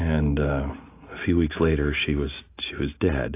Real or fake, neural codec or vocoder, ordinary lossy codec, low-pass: real; none; AAC, 24 kbps; 3.6 kHz